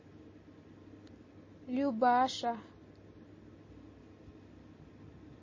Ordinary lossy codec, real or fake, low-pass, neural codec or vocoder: MP3, 32 kbps; real; 7.2 kHz; none